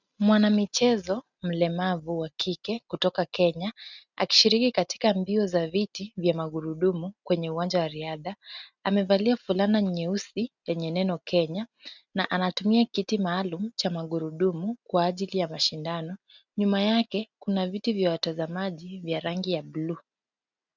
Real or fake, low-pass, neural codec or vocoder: real; 7.2 kHz; none